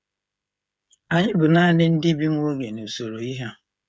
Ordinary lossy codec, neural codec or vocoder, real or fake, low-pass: none; codec, 16 kHz, 16 kbps, FreqCodec, smaller model; fake; none